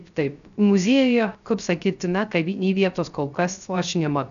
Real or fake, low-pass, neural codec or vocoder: fake; 7.2 kHz; codec, 16 kHz, 0.3 kbps, FocalCodec